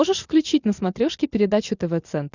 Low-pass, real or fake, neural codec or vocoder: 7.2 kHz; real; none